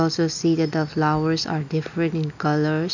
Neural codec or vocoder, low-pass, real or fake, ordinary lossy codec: none; 7.2 kHz; real; none